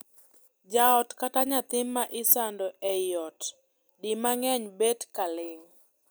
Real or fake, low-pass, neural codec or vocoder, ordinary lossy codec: real; none; none; none